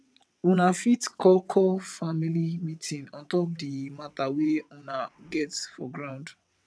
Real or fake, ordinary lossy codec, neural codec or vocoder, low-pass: fake; none; vocoder, 22.05 kHz, 80 mel bands, WaveNeXt; none